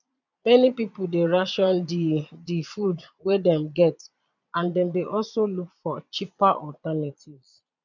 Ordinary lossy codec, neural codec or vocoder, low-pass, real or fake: none; vocoder, 22.05 kHz, 80 mel bands, Vocos; 7.2 kHz; fake